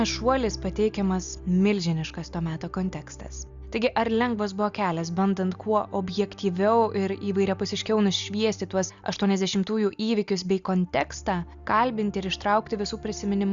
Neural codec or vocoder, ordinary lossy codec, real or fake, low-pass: none; Opus, 64 kbps; real; 7.2 kHz